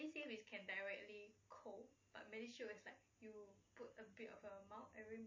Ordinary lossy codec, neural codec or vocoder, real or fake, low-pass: MP3, 32 kbps; none; real; 7.2 kHz